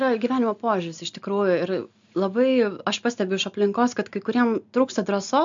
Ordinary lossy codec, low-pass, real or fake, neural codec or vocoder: MP3, 64 kbps; 7.2 kHz; real; none